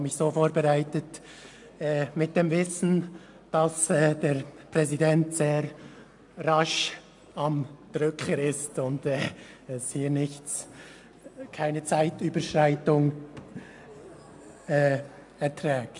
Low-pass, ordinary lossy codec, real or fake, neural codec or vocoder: 10.8 kHz; AAC, 64 kbps; real; none